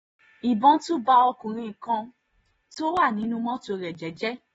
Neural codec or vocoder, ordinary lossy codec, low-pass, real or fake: none; AAC, 24 kbps; 19.8 kHz; real